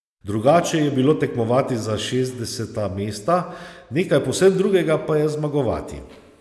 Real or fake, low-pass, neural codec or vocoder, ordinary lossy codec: real; none; none; none